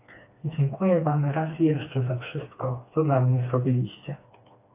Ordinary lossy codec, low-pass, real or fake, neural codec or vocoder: AAC, 24 kbps; 3.6 kHz; fake; codec, 16 kHz, 2 kbps, FreqCodec, smaller model